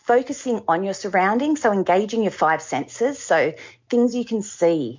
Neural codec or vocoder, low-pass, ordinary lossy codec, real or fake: none; 7.2 kHz; MP3, 48 kbps; real